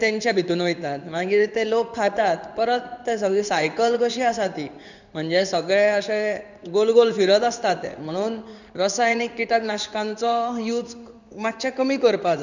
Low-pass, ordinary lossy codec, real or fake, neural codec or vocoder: 7.2 kHz; none; fake; codec, 16 kHz in and 24 kHz out, 1 kbps, XY-Tokenizer